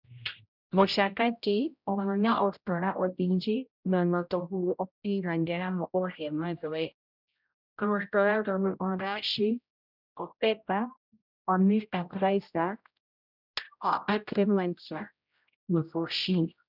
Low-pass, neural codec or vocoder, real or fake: 5.4 kHz; codec, 16 kHz, 0.5 kbps, X-Codec, HuBERT features, trained on general audio; fake